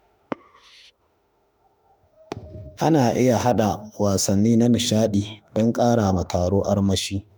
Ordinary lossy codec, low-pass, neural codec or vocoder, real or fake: none; none; autoencoder, 48 kHz, 32 numbers a frame, DAC-VAE, trained on Japanese speech; fake